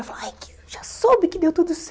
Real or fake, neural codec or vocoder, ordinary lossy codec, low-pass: real; none; none; none